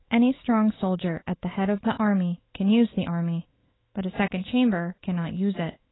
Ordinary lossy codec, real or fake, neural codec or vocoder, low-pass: AAC, 16 kbps; fake; autoencoder, 48 kHz, 128 numbers a frame, DAC-VAE, trained on Japanese speech; 7.2 kHz